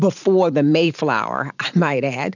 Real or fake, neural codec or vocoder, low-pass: fake; vocoder, 44.1 kHz, 128 mel bands every 512 samples, BigVGAN v2; 7.2 kHz